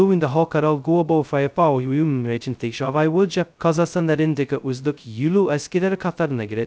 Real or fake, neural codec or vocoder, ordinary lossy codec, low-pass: fake; codec, 16 kHz, 0.2 kbps, FocalCodec; none; none